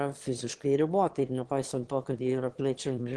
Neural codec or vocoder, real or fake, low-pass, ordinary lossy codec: autoencoder, 22.05 kHz, a latent of 192 numbers a frame, VITS, trained on one speaker; fake; 9.9 kHz; Opus, 16 kbps